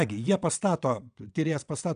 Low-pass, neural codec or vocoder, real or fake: 9.9 kHz; vocoder, 22.05 kHz, 80 mel bands, WaveNeXt; fake